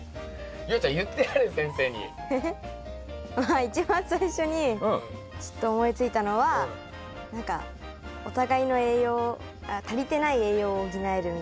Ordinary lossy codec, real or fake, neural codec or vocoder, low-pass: none; real; none; none